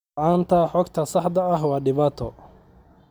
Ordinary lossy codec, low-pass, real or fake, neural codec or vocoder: none; 19.8 kHz; real; none